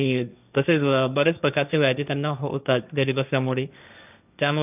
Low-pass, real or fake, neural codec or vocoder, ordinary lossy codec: 3.6 kHz; fake; codec, 16 kHz, 1.1 kbps, Voila-Tokenizer; none